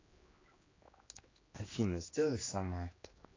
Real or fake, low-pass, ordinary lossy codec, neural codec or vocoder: fake; 7.2 kHz; AAC, 32 kbps; codec, 16 kHz, 2 kbps, X-Codec, HuBERT features, trained on general audio